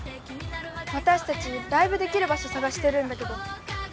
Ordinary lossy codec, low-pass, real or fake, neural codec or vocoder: none; none; real; none